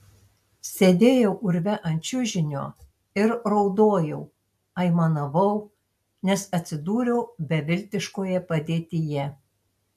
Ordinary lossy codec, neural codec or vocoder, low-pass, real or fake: AAC, 96 kbps; none; 14.4 kHz; real